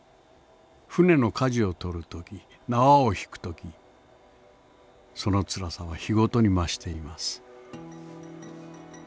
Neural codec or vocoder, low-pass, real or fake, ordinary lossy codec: none; none; real; none